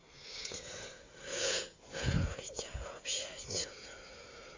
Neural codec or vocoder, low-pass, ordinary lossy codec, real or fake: codec, 16 kHz, 8 kbps, FreqCodec, smaller model; 7.2 kHz; AAC, 32 kbps; fake